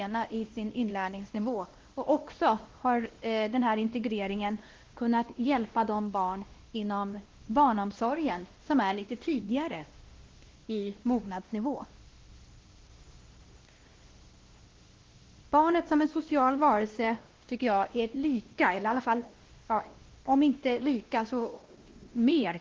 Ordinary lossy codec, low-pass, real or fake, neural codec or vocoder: Opus, 16 kbps; 7.2 kHz; fake; codec, 16 kHz, 1 kbps, X-Codec, WavLM features, trained on Multilingual LibriSpeech